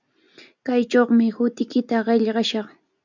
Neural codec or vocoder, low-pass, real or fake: none; 7.2 kHz; real